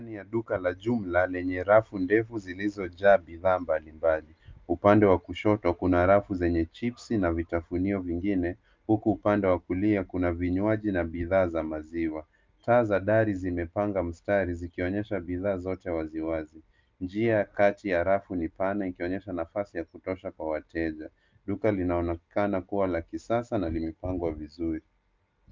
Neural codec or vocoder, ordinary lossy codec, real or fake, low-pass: none; Opus, 24 kbps; real; 7.2 kHz